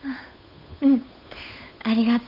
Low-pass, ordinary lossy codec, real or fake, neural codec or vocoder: 5.4 kHz; none; fake; codec, 16 kHz, 8 kbps, FunCodec, trained on Chinese and English, 25 frames a second